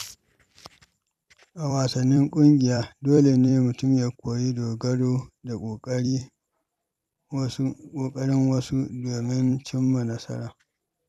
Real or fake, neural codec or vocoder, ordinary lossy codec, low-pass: fake; vocoder, 44.1 kHz, 128 mel bands every 256 samples, BigVGAN v2; none; 14.4 kHz